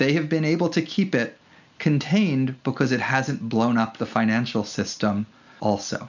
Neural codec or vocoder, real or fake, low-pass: none; real; 7.2 kHz